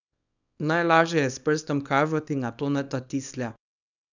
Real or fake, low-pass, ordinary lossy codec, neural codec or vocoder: fake; 7.2 kHz; none; codec, 24 kHz, 0.9 kbps, WavTokenizer, small release